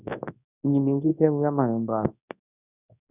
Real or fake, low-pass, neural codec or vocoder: fake; 3.6 kHz; codec, 24 kHz, 0.9 kbps, WavTokenizer, large speech release